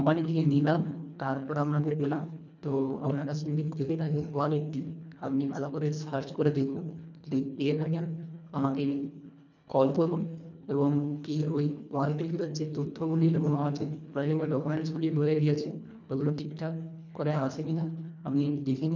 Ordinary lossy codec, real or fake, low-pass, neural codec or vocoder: none; fake; 7.2 kHz; codec, 24 kHz, 1.5 kbps, HILCodec